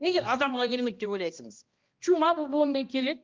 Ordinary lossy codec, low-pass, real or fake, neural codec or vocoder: Opus, 32 kbps; 7.2 kHz; fake; codec, 16 kHz, 1 kbps, X-Codec, HuBERT features, trained on balanced general audio